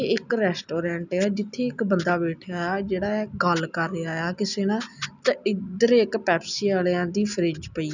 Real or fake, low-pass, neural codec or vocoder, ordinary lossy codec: real; 7.2 kHz; none; none